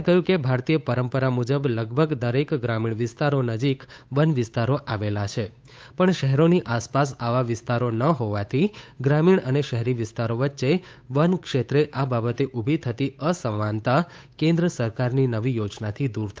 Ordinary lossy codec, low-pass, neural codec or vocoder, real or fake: none; none; codec, 16 kHz, 8 kbps, FunCodec, trained on Chinese and English, 25 frames a second; fake